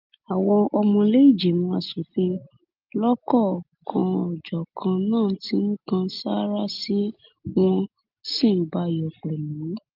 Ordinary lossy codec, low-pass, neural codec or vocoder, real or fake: Opus, 24 kbps; 5.4 kHz; none; real